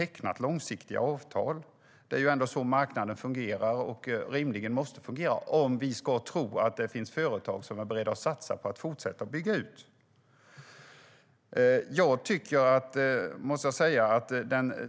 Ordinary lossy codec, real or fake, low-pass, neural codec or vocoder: none; real; none; none